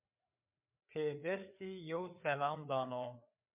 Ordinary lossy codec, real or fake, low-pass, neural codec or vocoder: MP3, 32 kbps; fake; 3.6 kHz; codec, 16 kHz, 4 kbps, FreqCodec, larger model